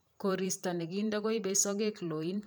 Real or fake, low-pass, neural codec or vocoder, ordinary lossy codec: fake; none; vocoder, 44.1 kHz, 128 mel bands every 512 samples, BigVGAN v2; none